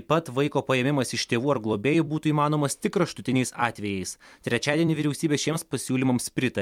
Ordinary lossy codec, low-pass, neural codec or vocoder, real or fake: MP3, 96 kbps; 19.8 kHz; vocoder, 44.1 kHz, 128 mel bands every 256 samples, BigVGAN v2; fake